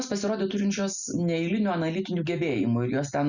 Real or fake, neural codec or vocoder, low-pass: real; none; 7.2 kHz